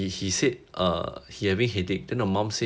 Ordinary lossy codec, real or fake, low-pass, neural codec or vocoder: none; real; none; none